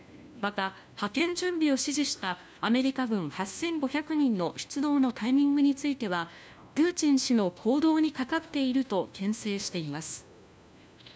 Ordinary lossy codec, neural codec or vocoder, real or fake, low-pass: none; codec, 16 kHz, 1 kbps, FunCodec, trained on LibriTTS, 50 frames a second; fake; none